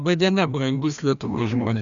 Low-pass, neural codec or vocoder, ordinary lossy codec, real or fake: 7.2 kHz; codec, 16 kHz, 1 kbps, FreqCodec, larger model; MP3, 96 kbps; fake